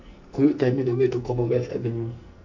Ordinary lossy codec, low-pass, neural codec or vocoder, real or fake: none; 7.2 kHz; codec, 44.1 kHz, 2.6 kbps, SNAC; fake